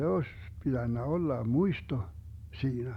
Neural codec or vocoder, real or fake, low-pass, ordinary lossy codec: none; real; 19.8 kHz; none